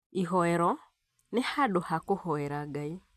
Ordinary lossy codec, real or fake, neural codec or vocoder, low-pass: AAC, 96 kbps; real; none; 14.4 kHz